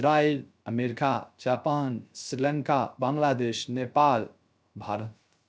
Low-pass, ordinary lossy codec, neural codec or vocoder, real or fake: none; none; codec, 16 kHz, 0.3 kbps, FocalCodec; fake